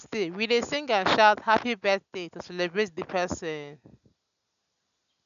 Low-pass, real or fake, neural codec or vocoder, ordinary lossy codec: 7.2 kHz; real; none; none